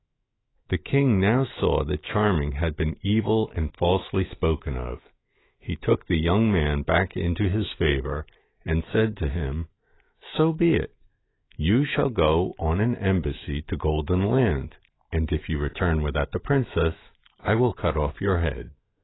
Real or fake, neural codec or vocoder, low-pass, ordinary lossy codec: fake; autoencoder, 48 kHz, 128 numbers a frame, DAC-VAE, trained on Japanese speech; 7.2 kHz; AAC, 16 kbps